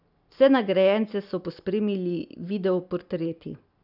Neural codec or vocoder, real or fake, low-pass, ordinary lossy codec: none; real; 5.4 kHz; none